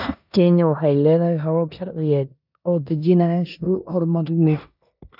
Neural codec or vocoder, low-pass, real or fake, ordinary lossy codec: codec, 16 kHz in and 24 kHz out, 0.9 kbps, LongCat-Audio-Codec, four codebook decoder; 5.4 kHz; fake; none